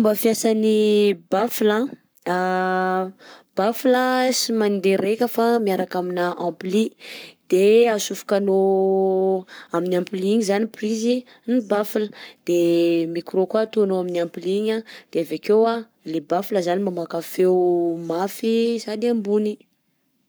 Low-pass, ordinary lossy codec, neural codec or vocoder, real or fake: none; none; codec, 44.1 kHz, 7.8 kbps, Pupu-Codec; fake